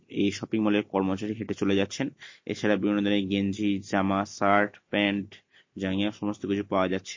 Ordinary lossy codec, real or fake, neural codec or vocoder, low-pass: MP3, 32 kbps; real; none; 7.2 kHz